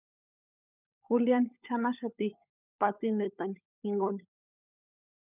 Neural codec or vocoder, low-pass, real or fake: codec, 16 kHz, 16 kbps, FunCodec, trained on LibriTTS, 50 frames a second; 3.6 kHz; fake